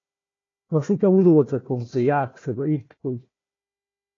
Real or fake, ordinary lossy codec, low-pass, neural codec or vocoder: fake; AAC, 32 kbps; 7.2 kHz; codec, 16 kHz, 1 kbps, FunCodec, trained on Chinese and English, 50 frames a second